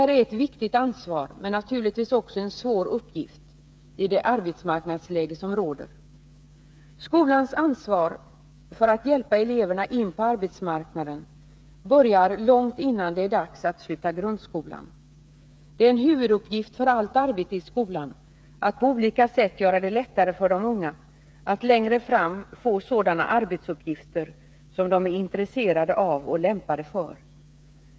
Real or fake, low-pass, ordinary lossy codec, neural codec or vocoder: fake; none; none; codec, 16 kHz, 8 kbps, FreqCodec, smaller model